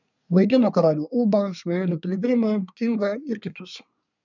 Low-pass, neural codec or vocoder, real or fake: 7.2 kHz; codec, 24 kHz, 1 kbps, SNAC; fake